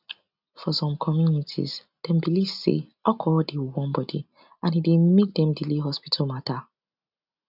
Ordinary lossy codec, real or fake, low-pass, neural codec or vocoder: none; real; 5.4 kHz; none